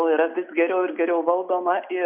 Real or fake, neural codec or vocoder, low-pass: real; none; 3.6 kHz